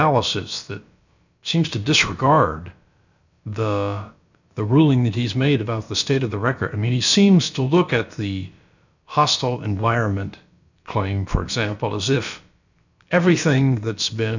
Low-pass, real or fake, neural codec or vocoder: 7.2 kHz; fake; codec, 16 kHz, about 1 kbps, DyCAST, with the encoder's durations